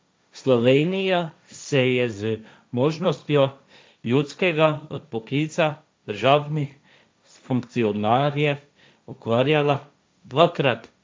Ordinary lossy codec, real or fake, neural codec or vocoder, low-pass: none; fake; codec, 16 kHz, 1.1 kbps, Voila-Tokenizer; none